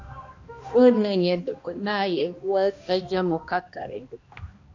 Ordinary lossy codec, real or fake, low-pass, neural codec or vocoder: AAC, 48 kbps; fake; 7.2 kHz; codec, 16 kHz, 1 kbps, X-Codec, HuBERT features, trained on balanced general audio